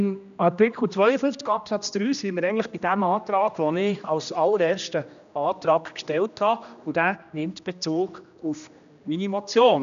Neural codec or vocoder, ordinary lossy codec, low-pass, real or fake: codec, 16 kHz, 1 kbps, X-Codec, HuBERT features, trained on general audio; none; 7.2 kHz; fake